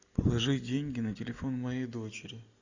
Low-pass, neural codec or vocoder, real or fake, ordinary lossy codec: 7.2 kHz; none; real; Opus, 64 kbps